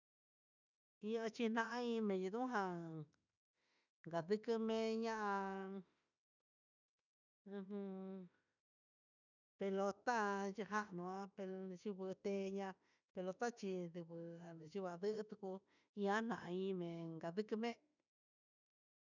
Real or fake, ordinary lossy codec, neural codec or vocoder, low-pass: fake; none; autoencoder, 48 kHz, 32 numbers a frame, DAC-VAE, trained on Japanese speech; 7.2 kHz